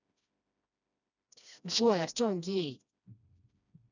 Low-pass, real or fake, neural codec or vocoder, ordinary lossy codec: 7.2 kHz; fake; codec, 16 kHz, 1 kbps, FreqCodec, smaller model; none